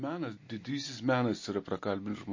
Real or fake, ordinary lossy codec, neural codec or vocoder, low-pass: real; MP3, 32 kbps; none; 7.2 kHz